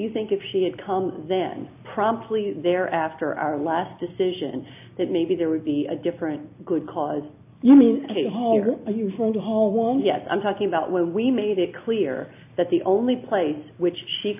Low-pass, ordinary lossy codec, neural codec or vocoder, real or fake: 3.6 kHz; AAC, 32 kbps; none; real